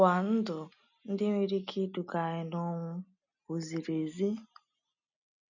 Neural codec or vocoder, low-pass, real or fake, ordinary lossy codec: none; 7.2 kHz; real; none